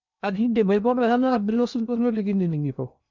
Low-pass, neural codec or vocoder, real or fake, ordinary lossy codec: 7.2 kHz; codec, 16 kHz in and 24 kHz out, 0.6 kbps, FocalCodec, streaming, 2048 codes; fake; none